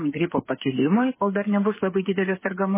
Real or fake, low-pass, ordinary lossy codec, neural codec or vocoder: fake; 3.6 kHz; MP3, 16 kbps; codec, 16 kHz, 8 kbps, FreqCodec, smaller model